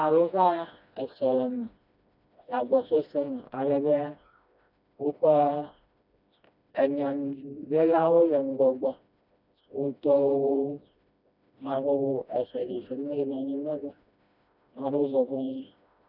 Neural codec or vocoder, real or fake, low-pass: codec, 16 kHz, 1 kbps, FreqCodec, smaller model; fake; 5.4 kHz